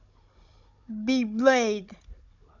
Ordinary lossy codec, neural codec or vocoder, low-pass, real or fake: none; codec, 16 kHz, 16 kbps, FunCodec, trained on Chinese and English, 50 frames a second; 7.2 kHz; fake